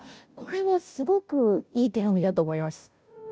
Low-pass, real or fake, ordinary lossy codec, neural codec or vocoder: none; fake; none; codec, 16 kHz, 0.5 kbps, FunCodec, trained on Chinese and English, 25 frames a second